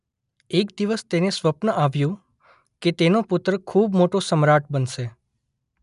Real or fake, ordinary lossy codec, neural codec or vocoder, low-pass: real; none; none; 10.8 kHz